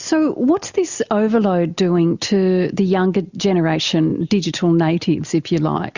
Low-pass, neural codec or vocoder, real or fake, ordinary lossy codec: 7.2 kHz; none; real; Opus, 64 kbps